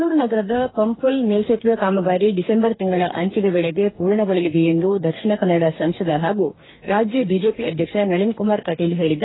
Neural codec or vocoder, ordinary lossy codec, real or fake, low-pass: codec, 44.1 kHz, 2.6 kbps, DAC; AAC, 16 kbps; fake; 7.2 kHz